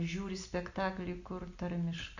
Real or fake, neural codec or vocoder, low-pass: real; none; 7.2 kHz